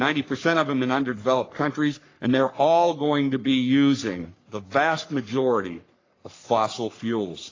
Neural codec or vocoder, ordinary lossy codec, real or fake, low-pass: codec, 44.1 kHz, 3.4 kbps, Pupu-Codec; AAC, 32 kbps; fake; 7.2 kHz